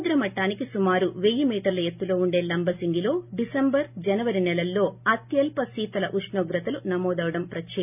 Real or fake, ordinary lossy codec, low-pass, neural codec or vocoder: real; none; 3.6 kHz; none